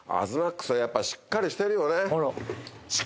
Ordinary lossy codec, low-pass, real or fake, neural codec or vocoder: none; none; real; none